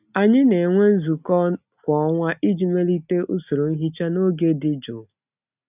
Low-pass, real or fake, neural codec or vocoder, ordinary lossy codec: 3.6 kHz; real; none; none